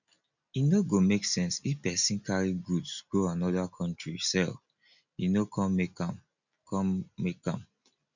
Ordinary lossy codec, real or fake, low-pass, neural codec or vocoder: none; real; 7.2 kHz; none